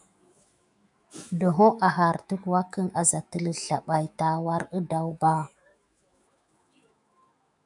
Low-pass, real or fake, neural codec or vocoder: 10.8 kHz; fake; autoencoder, 48 kHz, 128 numbers a frame, DAC-VAE, trained on Japanese speech